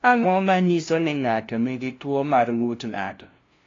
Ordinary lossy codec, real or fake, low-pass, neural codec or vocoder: AAC, 32 kbps; fake; 7.2 kHz; codec, 16 kHz, 0.5 kbps, FunCodec, trained on LibriTTS, 25 frames a second